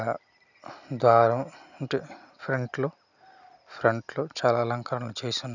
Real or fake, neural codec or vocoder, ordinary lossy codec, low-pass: real; none; none; 7.2 kHz